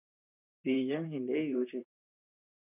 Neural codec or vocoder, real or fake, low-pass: vocoder, 44.1 kHz, 128 mel bands, Pupu-Vocoder; fake; 3.6 kHz